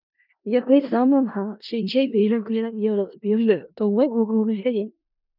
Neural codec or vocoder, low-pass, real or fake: codec, 16 kHz in and 24 kHz out, 0.4 kbps, LongCat-Audio-Codec, four codebook decoder; 5.4 kHz; fake